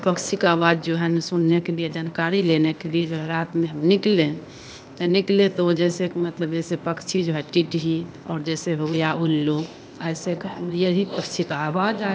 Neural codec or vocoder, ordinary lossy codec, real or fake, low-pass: codec, 16 kHz, 0.8 kbps, ZipCodec; none; fake; none